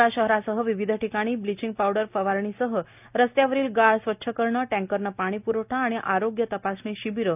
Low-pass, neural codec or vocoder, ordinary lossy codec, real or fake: 3.6 kHz; none; none; real